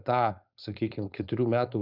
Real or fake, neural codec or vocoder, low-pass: fake; codec, 16 kHz, 4.8 kbps, FACodec; 5.4 kHz